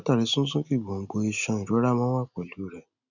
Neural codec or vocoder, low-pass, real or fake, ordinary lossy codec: none; 7.2 kHz; real; none